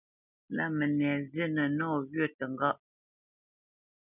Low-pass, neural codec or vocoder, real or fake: 3.6 kHz; none; real